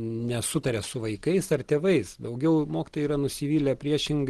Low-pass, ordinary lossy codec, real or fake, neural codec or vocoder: 10.8 kHz; Opus, 16 kbps; real; none